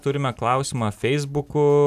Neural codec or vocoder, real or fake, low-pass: none; real; 14.4 kHz